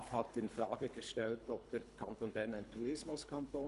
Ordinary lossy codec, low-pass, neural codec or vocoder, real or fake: none; 10.8 kHz; codec, 24 kHz, 3 kbps, HILCodec; fake